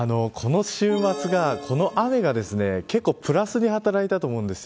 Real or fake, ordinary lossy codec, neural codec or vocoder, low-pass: real; none; none; none